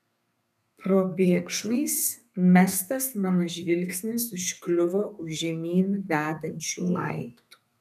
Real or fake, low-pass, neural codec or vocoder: fake; 14.4 kHz; codec, 32 kHz, 1.9 kbps, SNAC